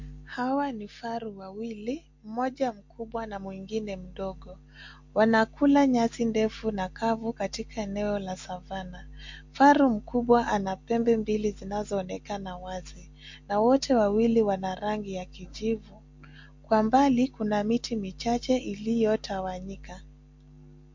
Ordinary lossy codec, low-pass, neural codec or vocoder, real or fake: MP3, 48 kbps; 7.2 kHz; none; real